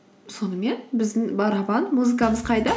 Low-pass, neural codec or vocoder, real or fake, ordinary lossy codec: none; none; real; none